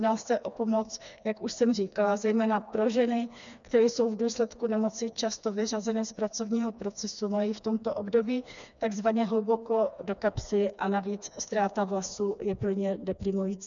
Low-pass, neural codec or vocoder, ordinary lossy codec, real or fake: 7.2 kHz; codec, 16 kHz, 2 kbps, FreqCodec, smaller model; MP3, 96 kbps; fake